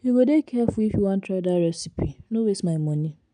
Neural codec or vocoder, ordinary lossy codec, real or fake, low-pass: none; none; real; 9.9 kHz